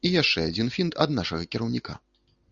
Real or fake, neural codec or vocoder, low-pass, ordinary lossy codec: real; none; 7.2 kHz; Opus, 64 kbps